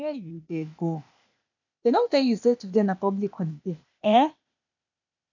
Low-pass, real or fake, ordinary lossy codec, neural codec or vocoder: 7.2 kHz; fake; none; codec, 16 kHz, 0.8 kbps, ZipCodec